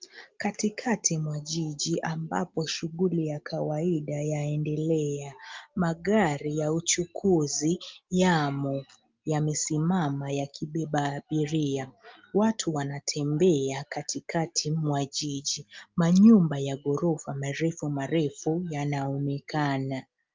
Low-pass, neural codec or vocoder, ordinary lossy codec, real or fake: 7.2 kHz; none; Opus, 24 kbps; real